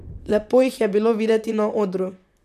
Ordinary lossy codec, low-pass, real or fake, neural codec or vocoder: none; 14.4 kHz; fake; vocoder, 44.1 kHz, 128 mel bands, Pupu-Vocoder